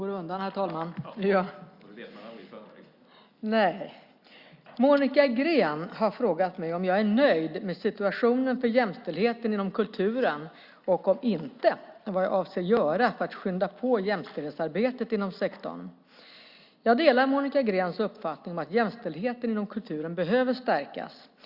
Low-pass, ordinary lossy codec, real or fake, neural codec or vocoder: 5.4 kHz; Opus, 64 kbps; real; none